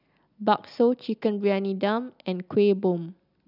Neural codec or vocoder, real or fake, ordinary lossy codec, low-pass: none; real; none; 5.4 kHz